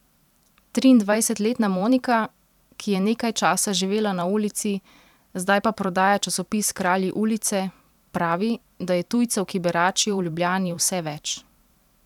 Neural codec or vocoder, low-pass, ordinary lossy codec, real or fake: vocoder, 44.1 kHz, 128 mel bands every 512 samples, BigVGAN v2; 19.8 kHz; none; fake